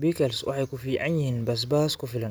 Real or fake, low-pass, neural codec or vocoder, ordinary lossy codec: real; none; none; none